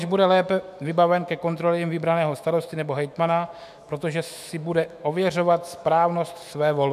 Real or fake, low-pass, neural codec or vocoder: fake; 14.4 kHz; autoencoder, 48 kHz, 128 numbers a frame, DAC-VAE, trained on Japanese speech